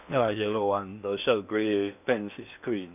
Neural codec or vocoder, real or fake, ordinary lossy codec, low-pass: codec, 16 kHz in and 24 kHz out, 0.8 kbps, FocalCodec, streaming, 65536 codes; fake; none; 3.6 kHz